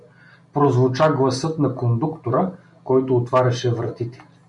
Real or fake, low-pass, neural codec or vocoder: real; 10.8 kHz; none